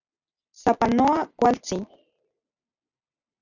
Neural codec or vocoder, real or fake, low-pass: none; real; 7.2 kHz